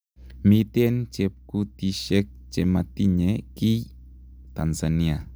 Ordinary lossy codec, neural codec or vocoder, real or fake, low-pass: none; none; real; none